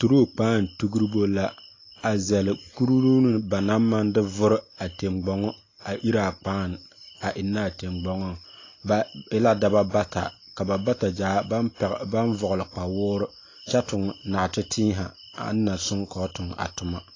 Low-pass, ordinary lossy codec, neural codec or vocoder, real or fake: 7.2 kHz; AAC, 32 kbps; none; real